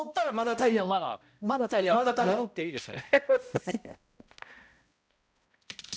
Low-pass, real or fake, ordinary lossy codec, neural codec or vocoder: none; fake; none; codec, 16 kHz, 0.5 kbps, X-Codec, HuBERT features, trained on balanced general audio